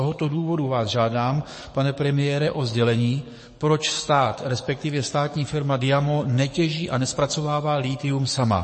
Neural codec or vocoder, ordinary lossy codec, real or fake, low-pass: codec, 44.1 kHz, 7.8 kbps, DAC; MP3, 32 kbps; fake; 10.8 kHz